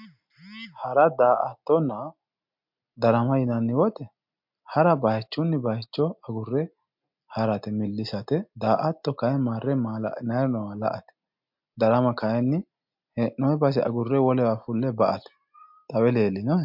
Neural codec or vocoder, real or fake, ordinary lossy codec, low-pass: none; real; MP3, 48 kbps; 5.4 kHz